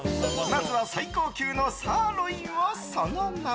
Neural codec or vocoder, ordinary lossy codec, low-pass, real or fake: none; none; none; real